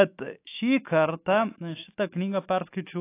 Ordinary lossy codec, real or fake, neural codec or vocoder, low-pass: AAC, 24 kbps; fake; autoencoder, 48 kHz, 128 numbers a frame, DAC-VAE, trained on Japanese speech; 3.6 kHz